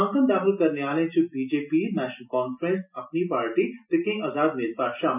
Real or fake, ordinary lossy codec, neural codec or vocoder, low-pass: real; none; none; 3.6 kHz